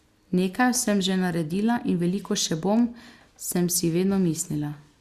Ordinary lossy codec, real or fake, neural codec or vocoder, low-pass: Opus, 64 kbps; real; none; 14.4 kHz